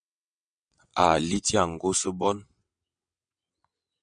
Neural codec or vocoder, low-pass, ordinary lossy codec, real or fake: vocoder, 22.05 kHz, 80 mel bands, WaveNeXt; 9.9 kHz; MP3, 96 kbps; fake